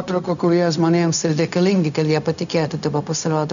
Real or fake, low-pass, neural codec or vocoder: fake; 7.2 kHz; codec, 16 kHz, 0.4 kbps, LongCat-Audio-Codec